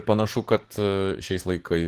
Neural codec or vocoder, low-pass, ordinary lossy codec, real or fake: codec, 44.1 kHz, 7.8 kbps, DAC; 14.4 kHz; Opus, 16 kbps; fake